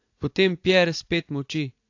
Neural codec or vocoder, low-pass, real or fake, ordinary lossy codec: vocoder, 44.1 kHz, 80 mel bands, Vocos; 7.2 kHz; fake; AAC, 48 kbps